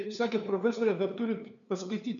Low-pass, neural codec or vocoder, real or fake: 7.2 kHz; codec, 16 kHz, 2 kbps, FunCodec, trained on LibriTTS, 25 frames a second; fake